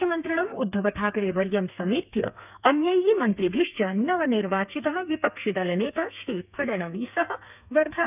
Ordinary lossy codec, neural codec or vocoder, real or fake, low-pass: none; codec, 32 kHz, 1.9 kbps, SNAC; fake; 3.6 kHz